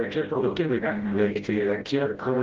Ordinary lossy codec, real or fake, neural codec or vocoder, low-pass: Opus, 16 kbps; fake; codec, 16 kHz, 0.5 kbps, FreqCodec, smaller model; 7.2 kHz